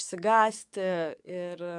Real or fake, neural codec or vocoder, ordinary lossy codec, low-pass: fake; codec, 44.1 kHz, 7.8 kbps, DAC; MP3, 96 kbps; 10.8 kHz